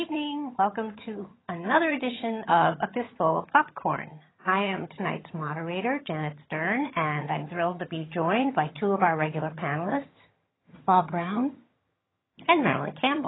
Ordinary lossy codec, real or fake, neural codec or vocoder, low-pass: AAC, 16 kbps; fake; vocoder, 22.05 kHz, 80 mel bands, HiFi-GAN; 7.2 kHz